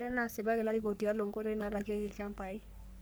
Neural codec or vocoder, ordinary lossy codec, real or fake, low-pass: codec, 44.1 kHz, 3.4 kbps, Pupu-Codec; none; fake; none